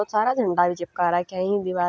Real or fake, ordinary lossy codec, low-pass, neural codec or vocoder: real; none; none; none